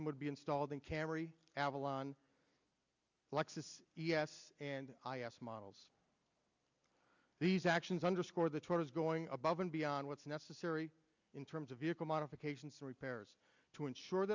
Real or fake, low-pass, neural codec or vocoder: real; 7.2 kHz; none